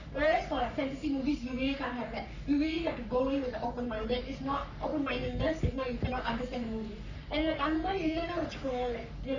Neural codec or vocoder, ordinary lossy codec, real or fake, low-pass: codec, 44.1 kHz, 3.4 kbps, Pupu-Codec; none; fake; 7.2 kHz